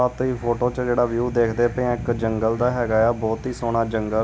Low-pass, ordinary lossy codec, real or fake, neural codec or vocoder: none; none; real; none